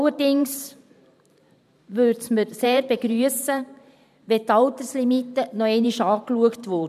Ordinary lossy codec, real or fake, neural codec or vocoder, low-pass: none; fake; vocoder, 44.1 kHz, 128 mel bands every 512 samples, BigVGAN v2; 14.4 kHz